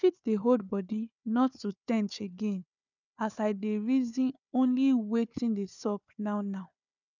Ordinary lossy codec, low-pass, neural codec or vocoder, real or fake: none; 7.2 kHz; codec, 16 kHz, 4 kbps, FunCodec, trained on Chinese and English, 50 frames a second; fake